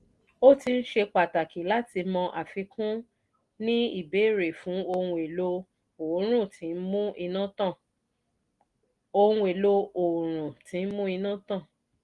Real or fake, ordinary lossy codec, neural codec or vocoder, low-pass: real; Opus, 24 kbps; none; 10.8 kHz